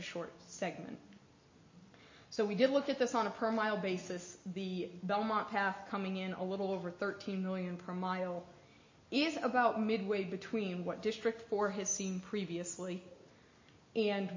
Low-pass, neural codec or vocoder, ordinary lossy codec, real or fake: 7.2 kHz; none; MP3, 32 kbps; real